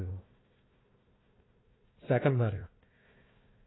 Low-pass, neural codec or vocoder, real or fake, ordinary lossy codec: 7.2 kHz; codec, 16 kHz, 1 kbps, FunCodec, trained on Chinese and English, 50 frames a second; fake; AAC, 16 kbps